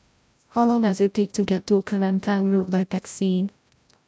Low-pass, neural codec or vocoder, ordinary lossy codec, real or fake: none; codec, 16 kHz, 0.5 kbps, FreqCodec, larger model; none; fake